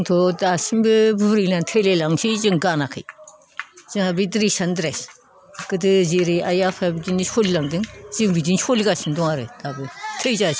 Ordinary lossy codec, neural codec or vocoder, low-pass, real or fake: none; none; none; real